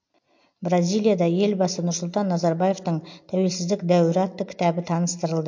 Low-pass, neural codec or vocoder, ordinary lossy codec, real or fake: 7.2 kHz; none; MP3, 48 kbps; real